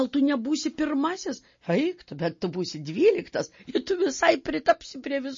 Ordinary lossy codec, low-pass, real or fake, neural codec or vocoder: MP3, 32 kbps; 7.2 kHz; real; none